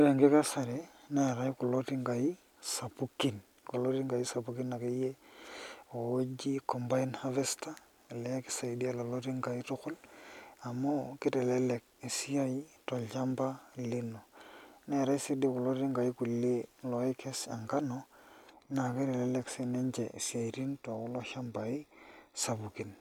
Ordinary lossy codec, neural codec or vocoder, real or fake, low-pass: none; none; real; 19.8 kHz